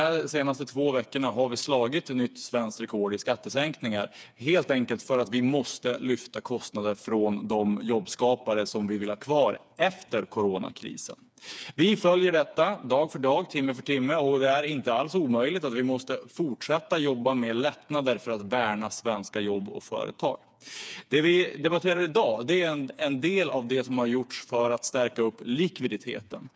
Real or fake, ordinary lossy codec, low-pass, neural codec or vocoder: fake; none; none; codec, 16 kHz, 4 kbps, FreqCodec, smaller model